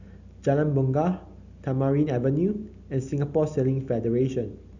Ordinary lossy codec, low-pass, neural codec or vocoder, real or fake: none; 7.2 kHz; none; real